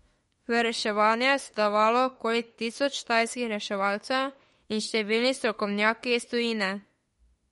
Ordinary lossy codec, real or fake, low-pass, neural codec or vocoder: MP3, 48 kbps; fake; 19.8 kHz; autoencoder, 48 kHz, 32 numbers a frame, DAC-VAE, trained on Japanese speech